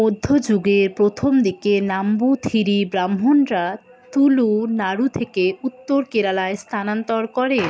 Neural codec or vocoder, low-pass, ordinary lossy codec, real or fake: none; none; none; real